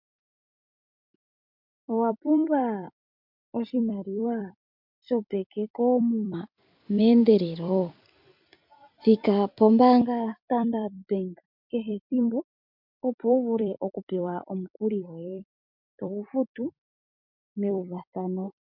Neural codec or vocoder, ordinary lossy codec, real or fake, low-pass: vocoder, 44.1 kHz, 128 mel bands every 256 samples, BigVGAN v2; MP3, 48 kbps; fake; 5.4 kHz